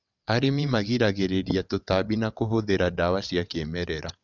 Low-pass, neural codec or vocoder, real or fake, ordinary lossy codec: 7.2 kHz; vocoder, 22.05 kHz, 80 mel bands, WaveNeXt; fake; none